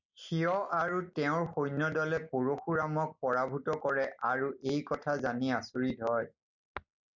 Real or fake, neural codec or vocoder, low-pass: real; none; 7.2 kHz